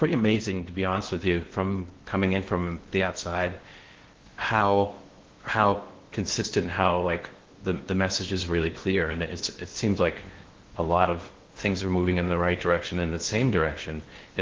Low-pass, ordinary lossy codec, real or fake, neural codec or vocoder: 7.2 kHz; Opus, 16 kbps; fake; codec, 16 kHz in and 24 kHz out, 0.8 kbps, FocalCodec, streaming, 65536 codes